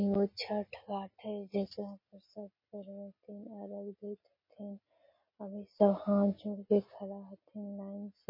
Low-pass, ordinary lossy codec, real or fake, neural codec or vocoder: 5.4 kHz; MP3, 24 kbps; real; none